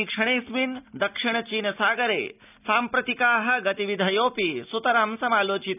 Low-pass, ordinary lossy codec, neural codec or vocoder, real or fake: 3.6 kHz; none; none; real